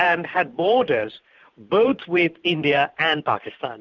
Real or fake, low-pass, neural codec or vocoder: fake; 7.2 kHz; vocoder, 44.1 kHz, 128 mel bands every 512 samples, BigVGAN v2